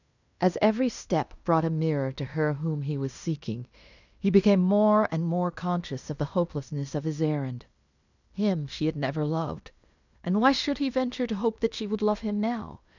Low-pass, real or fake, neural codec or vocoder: 7.2 kHz; fake; codec, 16 kHz in and 24 kHz out, 0.9 kbps, LongCat-Audio-Codec, fine tuned four codebook decoder